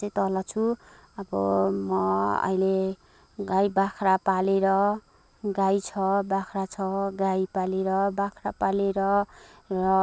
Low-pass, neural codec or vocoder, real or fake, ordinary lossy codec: none; none; real; none